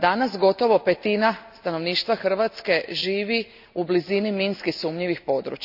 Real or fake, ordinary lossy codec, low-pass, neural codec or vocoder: real; none; 5.4 kHz; none